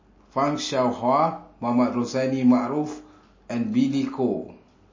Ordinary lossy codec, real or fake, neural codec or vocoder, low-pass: MP3, 32 kbps; real; none; 7.2 kHz